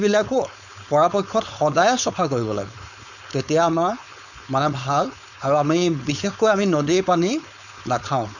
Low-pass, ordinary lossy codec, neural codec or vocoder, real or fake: 7.2 kHz; none; codec, 16 kHz, 4.8 kbps, FACodec; fake